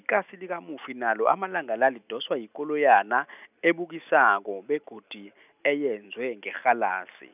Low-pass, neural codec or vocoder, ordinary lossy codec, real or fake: 3.6 kHz; none; none; real